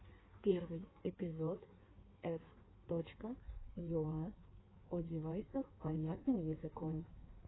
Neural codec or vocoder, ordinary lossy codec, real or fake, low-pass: codec, 16 kHz in and 24 kHz out, 1.1 kbps, FireRedTTS-2 codec; AAC, 16 kbps; fake; 7.2 kHz